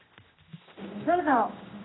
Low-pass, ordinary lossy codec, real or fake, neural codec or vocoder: 7.2 kHz; AAC, 16 kbps; fake; codec, 16 kHz, 1 kbps, X-Codec, HuBERT features, trained on general audio